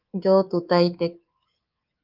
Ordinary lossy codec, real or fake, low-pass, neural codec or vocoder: Opus, 24 kbps; fake; 5.4 kHz; codec, 24 kHz, 3.1 kbps, DualCodec